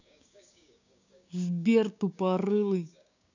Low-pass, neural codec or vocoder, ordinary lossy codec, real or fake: 7.2 kHz; codec, 16 kHz, 6 kbps, DAC; none; fake